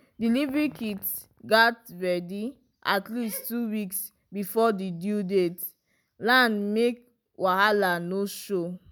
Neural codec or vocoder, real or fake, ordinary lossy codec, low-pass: none; real; none; none